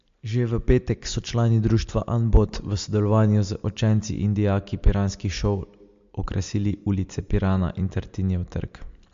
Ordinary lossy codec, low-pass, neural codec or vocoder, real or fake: MP3, 48 kbps; 7.2 kHz; none; real